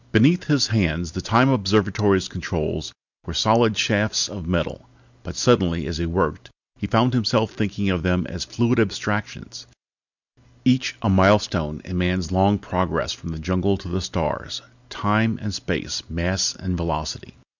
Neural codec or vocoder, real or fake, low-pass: none; real; 7.2 kHz